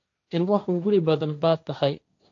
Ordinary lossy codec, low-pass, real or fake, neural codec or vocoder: AAC, 48 kbps; 7.2 kHz; fake; codec, 16 kHz, 1.1 kbps, Voila-Tokenizer